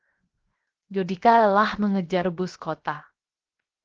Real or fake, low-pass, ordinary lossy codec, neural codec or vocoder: fake; 7.2 kHz; Opus, 16 kbps; codec, 16 kHz, 0.7 kbps, FocalCodec